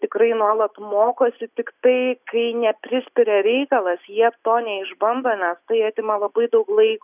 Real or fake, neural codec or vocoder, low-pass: real; none; 3.6 kHz